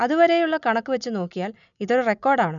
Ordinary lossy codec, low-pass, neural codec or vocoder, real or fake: none; 7.2 kHz; none; real